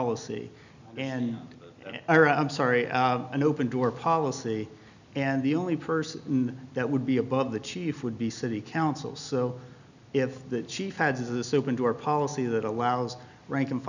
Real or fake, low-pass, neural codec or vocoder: real; 7.2 kHz; none